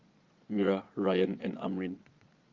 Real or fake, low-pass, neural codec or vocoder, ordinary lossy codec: fake; 7.2 kHz; vocoder, 22.05 kHz, 80 mel bands, Vocos; Opus, 32 kbps